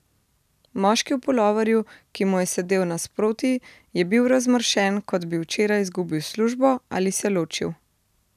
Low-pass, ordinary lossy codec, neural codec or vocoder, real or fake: 14.4 kHz; none; none; real